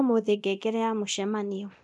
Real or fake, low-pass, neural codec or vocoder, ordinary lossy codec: fake; none; codec, 24 kHz, 0.9 kbps, DualCodec; none